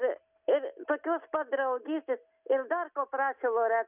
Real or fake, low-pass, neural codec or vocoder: real; 3.6 kHz; none